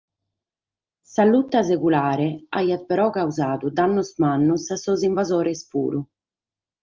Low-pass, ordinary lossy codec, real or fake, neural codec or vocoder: 7.2 kHz; Opus, 32 kbps; real; none